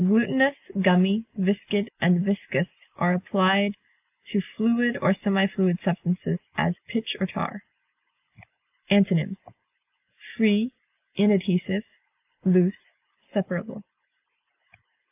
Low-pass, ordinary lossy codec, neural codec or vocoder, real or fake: 3.6 kHz; AAC, 32 kbps; none; real